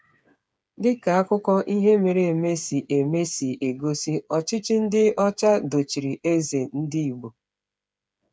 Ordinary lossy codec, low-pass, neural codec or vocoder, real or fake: none; none; codec, 16 kHz, 8 kbps, FreqCodec, smaller model; fake